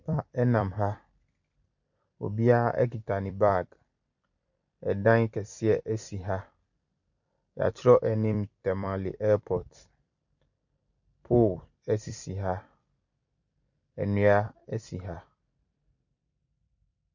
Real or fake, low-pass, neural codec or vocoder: fake; 7.2 kHz; vocoder, 44.1 kHz, 128 mel bands every 256 samples, BigVGAN v2